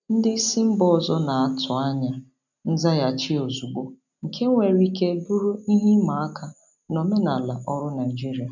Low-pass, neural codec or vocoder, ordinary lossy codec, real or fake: 7.2 kHz; none; none; real